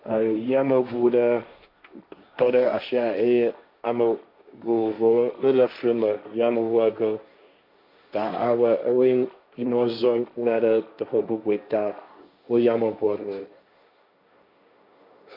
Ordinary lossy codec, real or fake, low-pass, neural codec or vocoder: MP3, 48 kbps; fake; 5.4 kHz; codec, 16 kHz, 1.1 kbps, Voila-Tokenizer